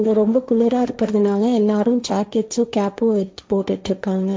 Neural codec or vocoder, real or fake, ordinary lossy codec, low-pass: codec, 16 kHz, 1.1 kbps, Voila-Tokenizer; fake; none; none